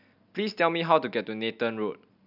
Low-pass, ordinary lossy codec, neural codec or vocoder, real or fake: 5.4 kHz; none; none; real